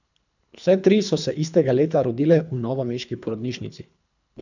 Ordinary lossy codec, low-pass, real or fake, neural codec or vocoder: none; 7.2 kHz; fake; codec, 24 kHz, 3 kbps, HILCodec